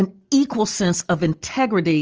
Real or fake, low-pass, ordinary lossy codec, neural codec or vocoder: real; 7.2 kHz; Opus, 24 kbps; none